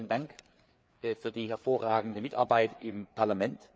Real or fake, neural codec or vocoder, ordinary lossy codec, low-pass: fake; codec, 16 kHz, 4 kbps, FreqCodec, larger model; none; none